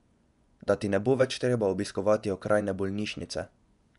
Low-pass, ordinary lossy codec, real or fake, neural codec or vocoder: 10.8 kHz; none; fake; vocoder, 24 kHz, 100 mel bands, Vocos